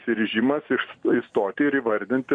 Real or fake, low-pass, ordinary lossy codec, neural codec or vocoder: real; 9.9 kHz; MP3, 64 kbps; none